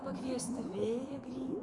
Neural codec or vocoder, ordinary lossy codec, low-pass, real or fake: autoencoder, 48 kHz, 128 numbers a frame, DAC-VAE, trained on Japanese speech; Opus, 64 kbps; 10.8 kHz; fake